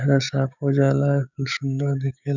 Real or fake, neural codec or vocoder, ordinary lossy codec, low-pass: fake; codec, 16 kHz, 6 kbps, DAC; none; 7.2 kHz